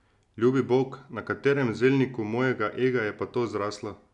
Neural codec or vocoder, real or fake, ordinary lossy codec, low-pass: none; real; none; 10.8 kHz